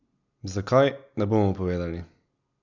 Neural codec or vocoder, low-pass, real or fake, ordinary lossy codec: none; 7.2 kHz; real; none